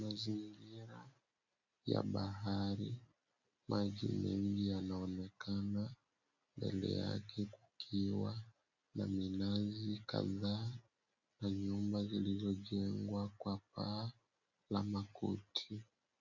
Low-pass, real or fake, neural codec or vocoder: 7.2 kHz; real; none